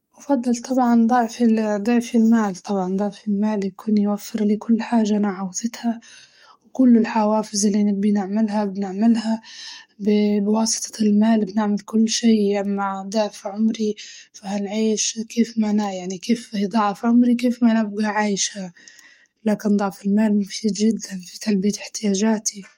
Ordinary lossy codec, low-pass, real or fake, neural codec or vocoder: MP3, 64 kbps; 19.8 kHz; fake; codec, 44.1 kHz, 7.8 kbps, DAC